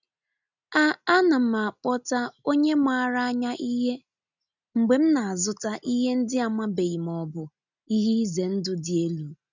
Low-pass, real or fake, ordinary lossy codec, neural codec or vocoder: 7.2 kHz; real; none; none